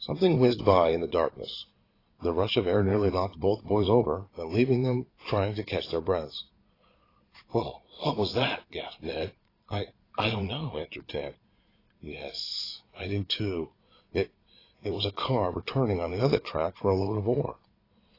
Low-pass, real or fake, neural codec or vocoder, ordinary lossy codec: 5.4 kHz; fake; vocoder, 22.05 kHz, 80 mel bands, Vocos; AAC, 24 kbps